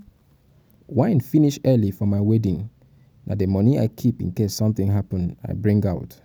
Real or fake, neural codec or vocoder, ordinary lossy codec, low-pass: real; none; none; none